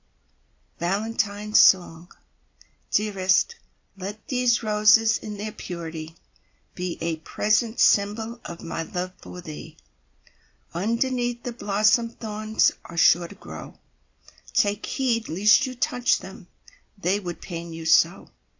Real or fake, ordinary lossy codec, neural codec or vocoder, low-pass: real; AAC, 48 kbps; none; 7.2 kHz